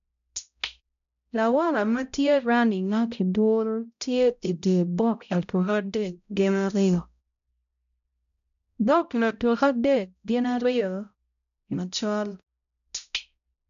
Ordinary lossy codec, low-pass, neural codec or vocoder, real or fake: none; 7.2 kHz; codec, 16 kHz, 0.5 kbps, X-Codec, HuBERT features, trained on balanced general audio; fake